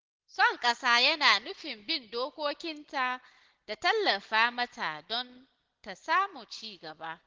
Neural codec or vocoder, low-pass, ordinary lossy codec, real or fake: none; 7.2 kHz; Opus, 16 kbps; real